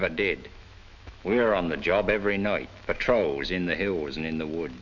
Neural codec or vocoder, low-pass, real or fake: autoencoder, 48 kHz, 128 numbers a frame, DAC-VAE, trained on Japanese speech; 7.2 kHz; fake